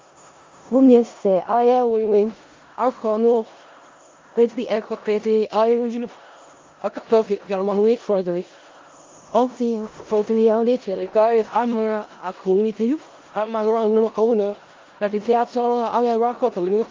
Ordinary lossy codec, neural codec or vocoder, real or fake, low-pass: Opus, 32 kbps; codec, 16 kHz in and 24 kHz out, 0.4 kbps, LongCat-Audio-Codec, four codebook decoder; fake; 7.2 kHz